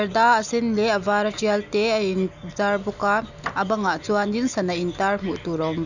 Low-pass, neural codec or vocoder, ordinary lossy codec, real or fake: 7.2 kHz; none; none; real